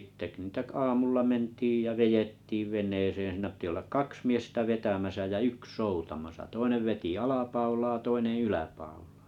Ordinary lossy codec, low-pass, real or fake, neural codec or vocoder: none; 19.8 kHz; real; none